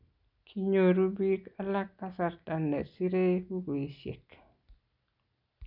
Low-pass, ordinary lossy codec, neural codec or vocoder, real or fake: 5.4 kHz; none; none; real